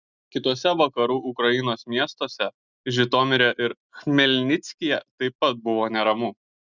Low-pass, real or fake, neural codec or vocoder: 7.2 kHz; real; none